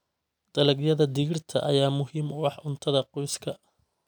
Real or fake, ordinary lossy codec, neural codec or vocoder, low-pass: real; none; none; none